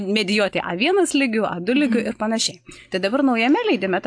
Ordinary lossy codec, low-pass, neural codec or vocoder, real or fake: AAC, 64 kbps; 9.9 kHz; none; real